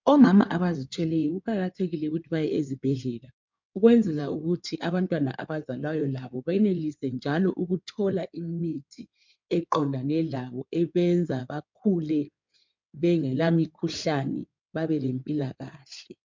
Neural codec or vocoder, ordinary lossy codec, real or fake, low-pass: codec, 16 kHz in and 24 kHz out, 2.2 kbps, FireRedTTS-2 codec; MP3, 64 kbps; fake; 7.2 kHz